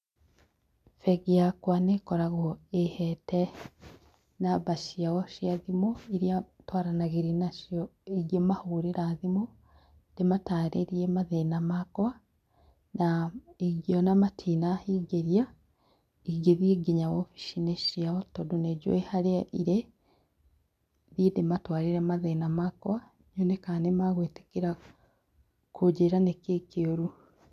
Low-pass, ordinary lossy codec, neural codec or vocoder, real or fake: 14.4 kHz; MP3, 96 kbps; none; real